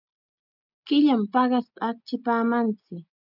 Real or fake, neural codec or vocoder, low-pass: real; none; 5.4 kHz